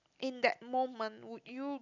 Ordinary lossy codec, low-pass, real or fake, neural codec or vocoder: none; 7.2 kHz; real; none